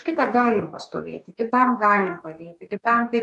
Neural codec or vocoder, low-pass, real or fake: codec, 44.1 kHz, 2.6 kbps, DAC; 10.8 kHz; fake